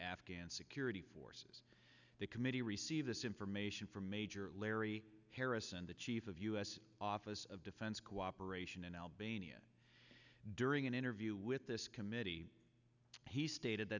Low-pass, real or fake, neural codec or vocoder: 7.2 kHz; real; none